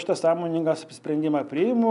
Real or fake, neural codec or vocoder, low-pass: real; none; 10.8 kHz